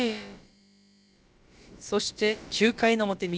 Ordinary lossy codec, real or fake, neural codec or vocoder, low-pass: none; fake; codec, 16 kHz, about 1 kbps, DyCAST, with the encoder's durations; none